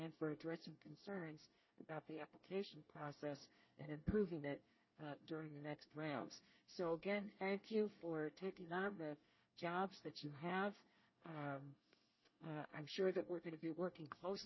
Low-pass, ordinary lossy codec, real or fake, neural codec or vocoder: 7.2 kHz; MP3, 24 kbps; fake; codec, 24 kHz, 1 kbps, SNAC